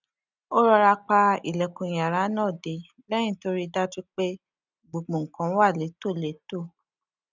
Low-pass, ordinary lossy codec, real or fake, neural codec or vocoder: 7.2 kHz; none; real; none